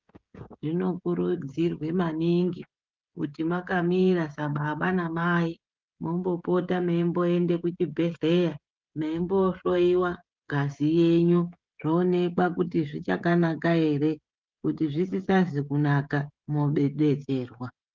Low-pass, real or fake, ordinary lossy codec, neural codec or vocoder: 7.2 kHz; fake; Opus, 24 kbps; codec, 16 kHz, 16 kbps, FreqCodec, smaller model